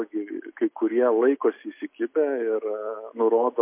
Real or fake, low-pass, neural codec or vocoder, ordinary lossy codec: real; 3.6 kHz; none; MP3, 24 kbps